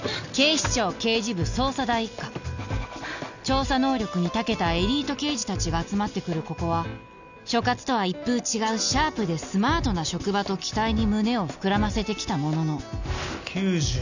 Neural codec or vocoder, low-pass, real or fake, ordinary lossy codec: none; 7.2 kHz; real; none